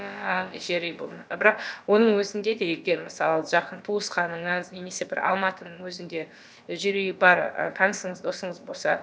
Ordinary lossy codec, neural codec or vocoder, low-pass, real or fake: none; codec, 16 kHz, about 1 kbps, DyCAST, with the encoder's durations; none; fake